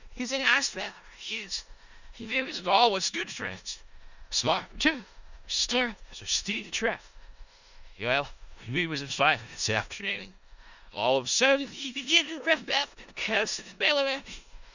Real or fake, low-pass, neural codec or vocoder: fake; 7.2 kHz; codec, 16 kHz in and 24 kHz out, 0.4 kbps, LongCat-Audio-Codec, four codebook decoder